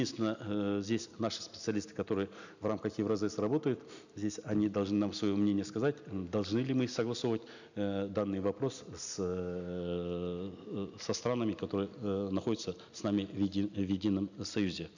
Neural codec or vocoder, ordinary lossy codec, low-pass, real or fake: none; none; 7.2 kHz; real